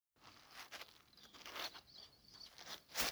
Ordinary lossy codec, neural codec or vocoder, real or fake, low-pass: none; codec, 44.1 kHz, 3.4 kbps, Pupu-Codec; fake; none